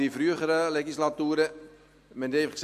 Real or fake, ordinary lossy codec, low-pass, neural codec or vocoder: real; MP3, 64 kbps; 14.4 kHz; none